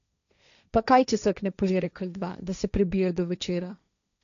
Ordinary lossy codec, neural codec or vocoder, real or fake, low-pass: none; codec, 16 kHz, 1.1 kbps, Voila-Tokenizer; fake; 7.2 kHz